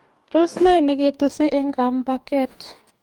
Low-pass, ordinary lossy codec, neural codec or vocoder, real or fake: 19.8 kHz; Opus, 32 kbps; codec, 44.1 kHz, 2.6 kbps, DAC; fake